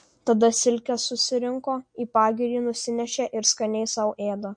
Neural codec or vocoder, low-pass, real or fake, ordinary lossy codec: none; 9.9 kHz; real; MP3, 48 kbps